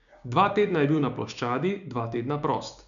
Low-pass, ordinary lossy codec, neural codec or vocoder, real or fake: 7.2 kHz; none; codec, 16 kHz, 6 kbps, DAC; fake